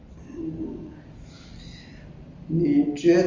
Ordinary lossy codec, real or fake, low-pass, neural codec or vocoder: Opus, 32 kbps; real; 7.2 kHz; none